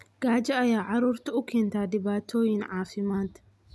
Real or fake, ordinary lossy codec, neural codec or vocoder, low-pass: real; none; none; none